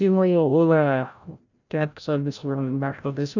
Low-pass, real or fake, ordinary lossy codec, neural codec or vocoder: 7.2 kHz; fake; none; codec, 16 kHz, 0.5 kbps, FreqCodec, larger model